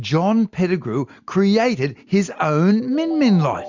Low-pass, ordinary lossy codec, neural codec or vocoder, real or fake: 7.2 kHz; MP3, 64 kbps; none; real